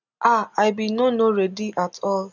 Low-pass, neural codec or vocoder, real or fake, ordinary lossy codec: 7.2 kHz; none; real; none